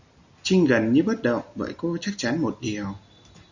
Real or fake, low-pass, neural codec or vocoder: real; 7.2 kHz; none